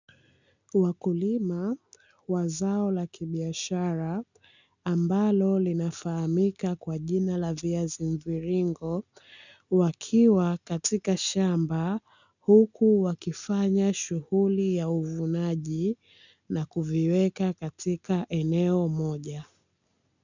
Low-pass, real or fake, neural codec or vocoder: 7.2 kHz; real; none